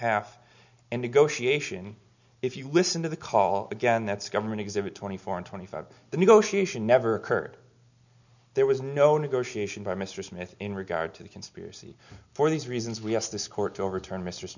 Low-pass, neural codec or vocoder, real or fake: 7.2 kHz; none; real